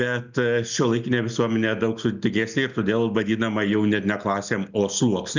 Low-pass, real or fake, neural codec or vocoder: 7.2 kHz; real; none